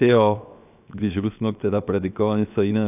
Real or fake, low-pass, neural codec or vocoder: fake; 3.6 kHz; codec, 16 kHz, 0.7 kbps, FocalCodec